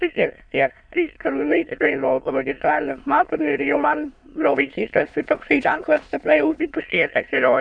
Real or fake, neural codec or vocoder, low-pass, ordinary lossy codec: fake; autoencoder, 22.05 kHz, a latent of 192 numbers a frame, VITS, trained on many speakers; 9.9 kHz; AAC, 64 kbps